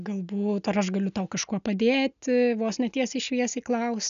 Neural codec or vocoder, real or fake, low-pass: none; real; 7.2 kHz